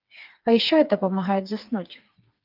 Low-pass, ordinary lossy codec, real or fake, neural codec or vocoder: 5.4 kHz; Opus, 24 kbps; fake; codec, 16 kHz, 4 kbps, FreqCodec, smaller model